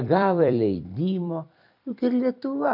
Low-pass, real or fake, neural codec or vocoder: 5.4 kHz; fake; vocoder, 44.1 kHz, 128 mel bands every 256 samples, BigVGAN v2